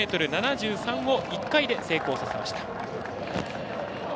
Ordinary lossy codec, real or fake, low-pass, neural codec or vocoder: none; real; none; none